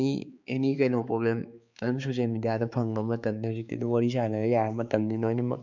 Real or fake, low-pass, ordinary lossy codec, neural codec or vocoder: fake; 7.2 kHz; MP3, 64 kbps; codec, 16 kHz, 4 kbps, X-Codec, HuBERT features, trained on balanced general audio